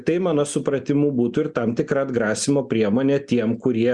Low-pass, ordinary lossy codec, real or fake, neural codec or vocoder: 10.8 kHz; Opus, 64 kbps; real; none